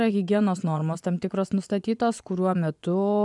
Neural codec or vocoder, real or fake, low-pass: vocoder, 24 kHz, 100 mel bands, Vocos; fake; 10.8 kHz